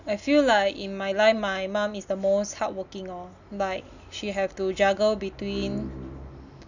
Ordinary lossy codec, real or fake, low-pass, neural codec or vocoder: none; real; 7.2 kHz; none